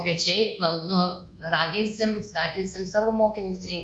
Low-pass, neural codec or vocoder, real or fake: 10.8 kHz; codec, 24 kHz, 1.2 kbps, DualCodec; fake